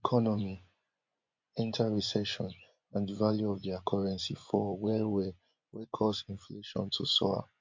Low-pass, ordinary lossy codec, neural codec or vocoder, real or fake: 7.2 kHz; MP3, 48 kbps; vocoder, 24 kHz, 100 mel bands, Vocos; fake